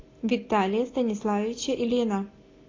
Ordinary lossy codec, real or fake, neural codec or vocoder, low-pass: AAC, 32 kbps; real; none; 7.2 kHz